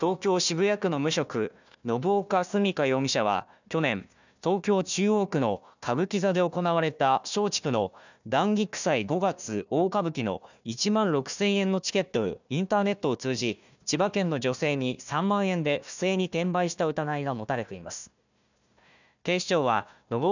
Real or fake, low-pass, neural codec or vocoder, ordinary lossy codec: fake; 7.2 kHz; codec, 16 kHz, 1 kbps, FunCodec, trained on Chinese and English, 50 frames a second; none